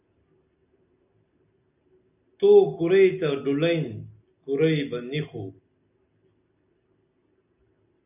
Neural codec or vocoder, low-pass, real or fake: none; 3.6 kHz; real